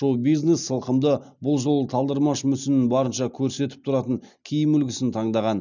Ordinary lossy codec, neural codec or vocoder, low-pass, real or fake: none; none; 7.2 kHz; real